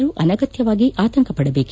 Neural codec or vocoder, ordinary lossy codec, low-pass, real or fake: none; none; none; real